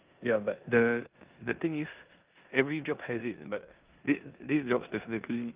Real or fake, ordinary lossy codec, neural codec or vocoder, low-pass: fake; Opus, 32 kbps; codec, 16 kHz in and 24 kHz out, 0.9 kbps, LongCat-Audio-Codec, four codebook decoder; 3.6 kHz